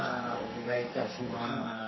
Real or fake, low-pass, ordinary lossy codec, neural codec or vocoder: fake; 7.2 kHz; MP3, 24 kbps; codec, 44.1 kHz, 2.6 kbps, SNAC